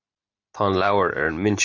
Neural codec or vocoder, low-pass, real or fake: none; 7.2 kHz; real